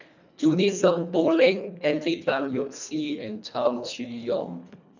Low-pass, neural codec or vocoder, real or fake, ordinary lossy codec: 7.2 kHz; codec, 24 kHz, 1.5 kbps, HILCodec; fake; none